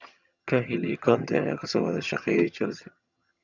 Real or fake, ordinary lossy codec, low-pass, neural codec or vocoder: fake; none; 7.2 kHz; vocoder, 22.05 kHz, 80 mel bands, HiFi-GAN